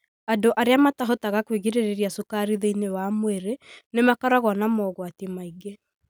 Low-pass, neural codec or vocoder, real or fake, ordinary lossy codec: none; none; real; none